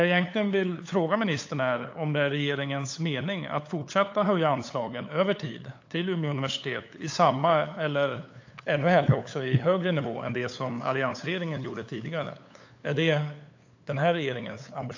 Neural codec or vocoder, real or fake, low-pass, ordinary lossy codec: codec, 16 kHz, 8 kbps, FunCodec, trained on LibriTTS, 25 frames a second; fake; 7.2 kHz; AAC, 48 kbps